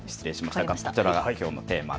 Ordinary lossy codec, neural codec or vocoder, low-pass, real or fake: none; none; none; real